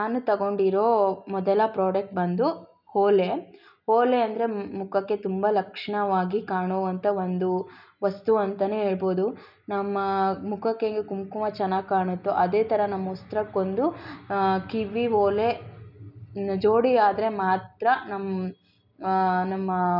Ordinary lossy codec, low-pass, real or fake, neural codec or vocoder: none; 5.4 kHz; real; none